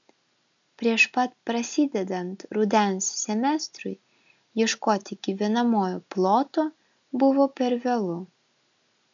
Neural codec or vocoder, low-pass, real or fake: none; 7.2 kHz; real